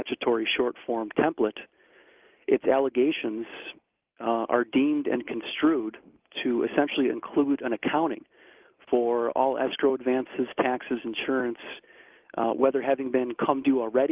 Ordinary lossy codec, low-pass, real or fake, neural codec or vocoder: Opus, 24 kbps; 3.6 kHz; real; none